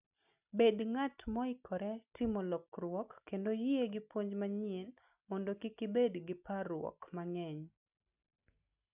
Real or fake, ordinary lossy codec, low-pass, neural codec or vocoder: real; none; 3.6 kHz; none